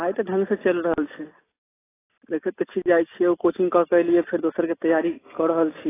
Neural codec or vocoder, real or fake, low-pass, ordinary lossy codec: none; real; 3.6 kHz; AAC, 16 kbps